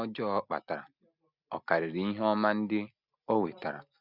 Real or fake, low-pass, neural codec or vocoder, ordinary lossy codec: real; 5.4 kHz; none; none